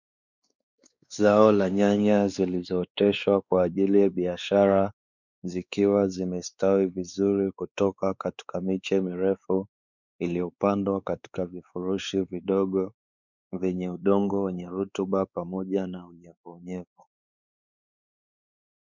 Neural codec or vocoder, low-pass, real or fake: codec, 16 kHz, 4 kbps, X-Codec, WavLM features, trained on Multilingual LibriSpeech; 7.2 kHz; fake